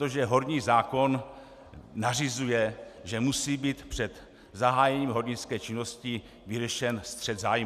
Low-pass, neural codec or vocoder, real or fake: 14.4 kHz; none; real